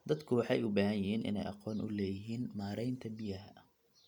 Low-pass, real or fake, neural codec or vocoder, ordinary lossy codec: 19.8 kHz; real; none; none